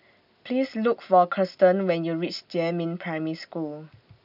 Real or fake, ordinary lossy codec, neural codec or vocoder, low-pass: real; none; none; 5.4 kHz